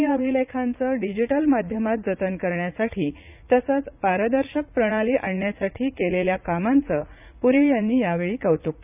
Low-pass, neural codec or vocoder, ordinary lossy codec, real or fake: 3.6 kHz; vocoder, 44.1 kHz, 80 mel bands, Vocos; none; fake